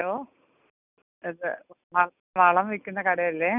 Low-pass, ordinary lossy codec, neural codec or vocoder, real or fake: 3.6 kHz; none; none; real